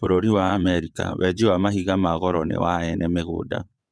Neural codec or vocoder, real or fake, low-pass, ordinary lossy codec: vocoder, 22.05 kHz, 80 mel bands, WaveNeXt; fake; none; none